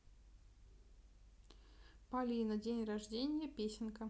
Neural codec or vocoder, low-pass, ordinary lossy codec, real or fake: none; none; none; real